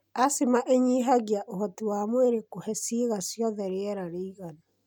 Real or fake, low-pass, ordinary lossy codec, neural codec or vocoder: real; none; none; none